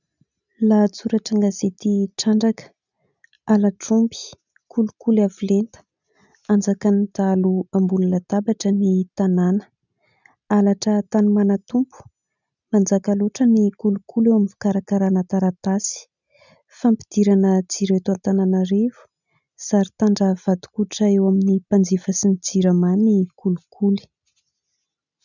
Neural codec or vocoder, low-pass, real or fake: none; 7.2 kHz; real